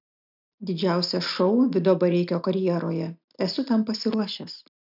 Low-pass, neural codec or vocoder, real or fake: 5.4 kHz; none; real